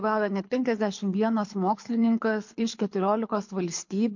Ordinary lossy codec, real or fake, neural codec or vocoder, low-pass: MP3, 48 kbps; fake; codec, 24 kHz, 6 kbps, HILCodec; 7.2 kHz